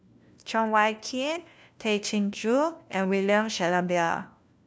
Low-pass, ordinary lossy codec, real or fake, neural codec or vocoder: none; none; fake; codec, 16 kHz, 1 kbps, FunCodec, trained on LibriTTS, 50 frames a second